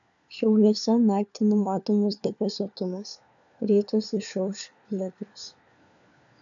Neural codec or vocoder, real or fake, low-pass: codec, 16 kHz, 4 kbps, FunCodec, trained on LibriTTS, 50 frames a second; fake; 7.2 kHz